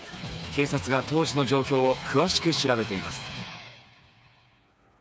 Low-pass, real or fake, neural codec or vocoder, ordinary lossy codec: none; fake; codec, 16 kHz, 4 kbps, FreqCodec, smaller model; none